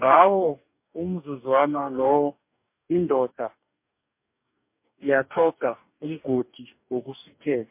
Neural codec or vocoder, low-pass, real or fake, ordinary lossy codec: codec, 44.1 kHz, 2.6 kbps, DAC; 3.6 kHz; fake; MP3, 32 kbps